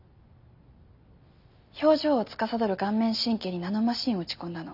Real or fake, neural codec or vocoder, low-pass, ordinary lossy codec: real; none; 5.4 kHz; none